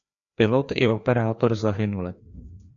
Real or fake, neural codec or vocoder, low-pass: fake; codec, 16 kHz, 2 kbps, FreqCodec, larger model; 7.2 kHz